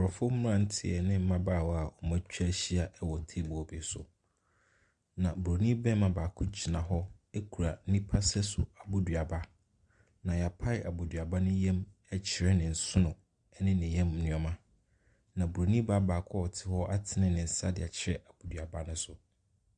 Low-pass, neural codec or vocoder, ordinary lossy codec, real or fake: 9.9 kHz; none; Opus, 64 kbps; real